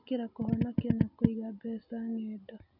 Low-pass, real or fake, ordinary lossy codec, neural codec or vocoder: 5.4 kHz; real; none; none